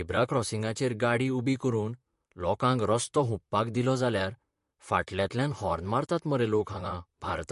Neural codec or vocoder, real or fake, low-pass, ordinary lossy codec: vocoder, 44.1 kHz, 128 mel bands, Pupu-Vocoder; fake; 14.4 kHz; MP3, 48 kbps